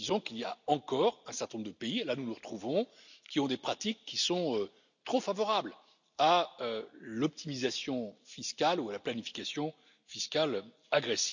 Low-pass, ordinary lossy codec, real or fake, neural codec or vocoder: 7.2 kHz; none; real; none